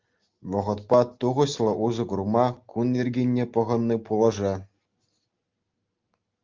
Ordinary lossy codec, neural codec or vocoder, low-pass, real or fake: Opus, 32 kbps; none; 7.2 kHz; real